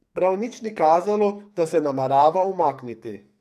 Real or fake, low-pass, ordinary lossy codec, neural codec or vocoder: fake; 14.4 kHz; MP3, 96 kbps; codec, 44.1 kHz, 2.6 kbps, SNAC